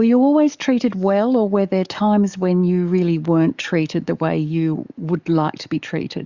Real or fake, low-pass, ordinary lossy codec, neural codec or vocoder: fake; 7.2 kHz; Opus, 64 kbps; codec, 44.1 kHz, 7.8 kbps, DAC